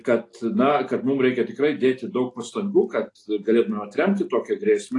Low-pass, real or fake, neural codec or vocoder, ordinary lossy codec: 10.8 kHz; real; none; AAC, 48 kbps